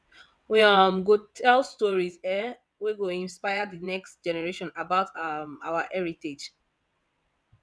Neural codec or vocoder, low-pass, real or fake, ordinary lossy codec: vocoder, 22.05 kHz, 80 mel bands, WaveNeXt; none; fake; none